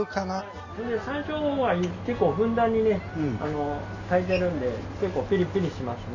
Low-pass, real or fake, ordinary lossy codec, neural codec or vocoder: 7.2 kHz; real; none; none